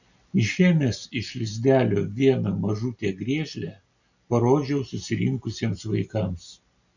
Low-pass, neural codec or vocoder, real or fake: 7.2 kHz; none; real